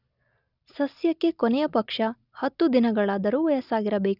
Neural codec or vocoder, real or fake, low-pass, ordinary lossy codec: none; real; 5.4 kHz; none